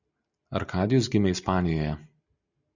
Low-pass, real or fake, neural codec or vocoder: 7.2 kHz; real; none